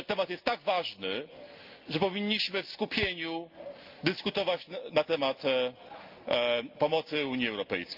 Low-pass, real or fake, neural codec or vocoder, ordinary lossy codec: 5.4 kHz; real; none; Opus, 24 kbps